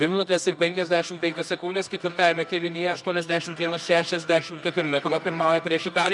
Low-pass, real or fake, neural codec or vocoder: 10.8 kHz; fake; codec, 24 kHz, 0.9 kbps, WavTokenizer, medium music audio release